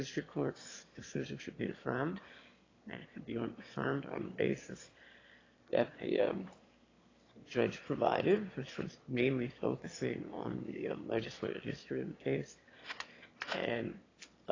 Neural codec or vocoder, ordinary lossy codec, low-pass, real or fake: autoencoder, 22.05 kHz, a latent of 192 numbers a frame, VITS, trained on one speaker; AAC, 32 kbps; 7.2 kHz; fake